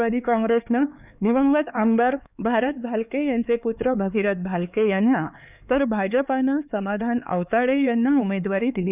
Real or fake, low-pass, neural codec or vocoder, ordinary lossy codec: fake; 3.6 kHz; codec, 16 kHz, 4 kbps, X-Codec, HuBERT features, trained on LibriSpeech; none